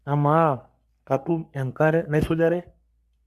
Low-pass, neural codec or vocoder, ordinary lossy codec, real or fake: 14.4 kHz; codec, 44.1 kHz, 3.4 kbps, Pupu-Codec; Opus, 64 kbps; fake